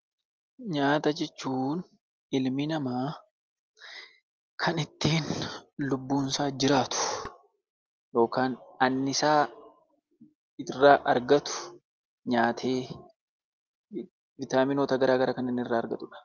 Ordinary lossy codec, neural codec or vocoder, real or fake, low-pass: Opus, 24 kbps; none; real; 7.2 kHz